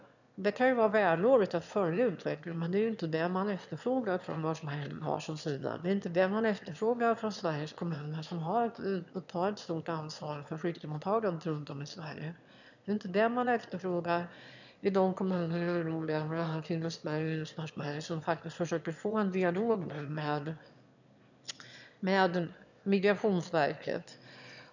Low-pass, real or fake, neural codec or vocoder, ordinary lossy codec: 7.2 kHz; fake; autoencoder, 22.05 kHz, a latent of 192 numbers a frame, VITS, trained on one speaker; none